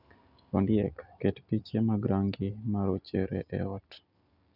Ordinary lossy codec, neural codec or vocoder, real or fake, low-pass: none; none; real; 5.4 kHz